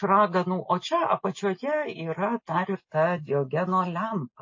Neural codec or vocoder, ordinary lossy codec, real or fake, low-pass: none; MP3, 32 kbps; real; 7.2 kHz